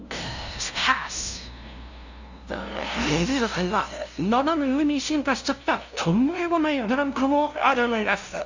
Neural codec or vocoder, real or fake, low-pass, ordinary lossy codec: codec, 16 kHz, 0.5 kbps, FunCodec, trained on LibriTTS, 25 frames a second; fake; 7.2 kHz; Opus, 64 kbps